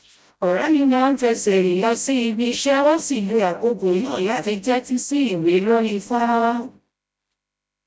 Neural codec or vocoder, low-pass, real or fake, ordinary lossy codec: codec, 16 kHz, 0.5 kbps, FreqCodec, smaller model; none; fake; none